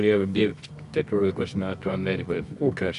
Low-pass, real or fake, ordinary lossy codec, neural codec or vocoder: 10.8 kHz; fake; AAC, 96 kbps; codec, 24 kHz, 0.9 kbps, WavTokenizer, medium music audio release